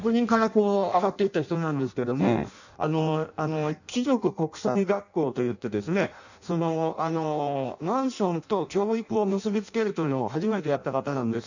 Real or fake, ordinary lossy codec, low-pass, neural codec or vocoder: fake; none; 7.2 kHz; codec, 16 kHz in and 24 kHz out, 0.6 kbps, FireRedTTS-2 codec